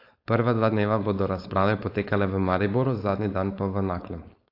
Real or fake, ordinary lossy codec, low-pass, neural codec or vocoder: fake; AAC, 32 kbps; 5.4 kHz; codec, 16 kHz, 4.8 kbps, FACodec